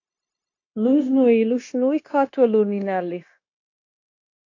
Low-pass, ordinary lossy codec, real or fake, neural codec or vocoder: 7.2 kHz; AAC, 48 kbps; fake; codec, 16 kHz, 0.9 kbps, LongCat-Audio-Codec